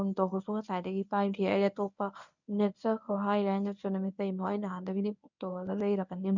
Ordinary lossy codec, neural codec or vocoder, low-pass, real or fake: none; codec, 24 kHz, 0.9 kbps, WavTokenizer, medium speech release version 1; 7.2 kHz; fake